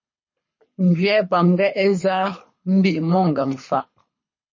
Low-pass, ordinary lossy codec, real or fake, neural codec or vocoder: 7.2 kHz; MP3, 32 kbps; fake; codec, 24 kHz, 3 kbps, HILCodec